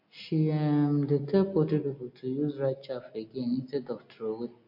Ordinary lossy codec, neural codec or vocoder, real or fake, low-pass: MP3, 32 kbps; none; real; 5.4 kHz